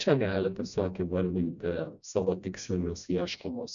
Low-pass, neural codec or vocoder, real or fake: 7.2 kHz; codec, 16 kHz, 1 kbps, FreqCodec, smaller model; fake